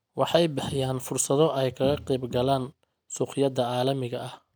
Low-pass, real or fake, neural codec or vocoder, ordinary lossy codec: none; real; none; none